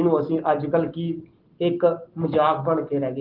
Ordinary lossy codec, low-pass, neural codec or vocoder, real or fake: Opus, 16 kbps; 5.4 kHz; none; real